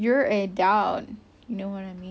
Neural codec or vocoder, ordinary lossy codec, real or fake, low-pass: none; none; real; none